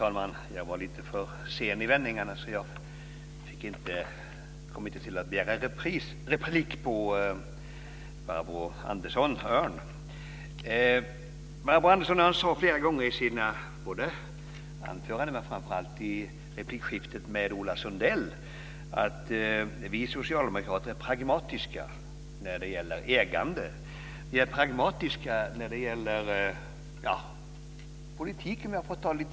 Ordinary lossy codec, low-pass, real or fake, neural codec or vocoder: none; none; real; none